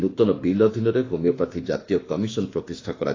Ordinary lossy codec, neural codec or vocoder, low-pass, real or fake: AAC, 32 kbps; autoencoder, 48 kHz, 32 numbers a frame, DAC-VAE, trained on Japanese speech; 7.2 kHz; fake